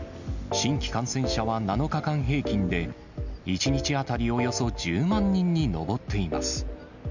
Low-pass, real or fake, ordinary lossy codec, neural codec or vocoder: 7.2 kHz; real; none; none